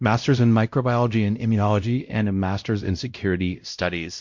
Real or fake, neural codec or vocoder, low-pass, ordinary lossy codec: fake; codec, 16 kHz, 0.5 kbps, X-Codec, WavLM features, trained on Multilingual LibriSpeech; 7.2 kHz; MP3, 48 kbps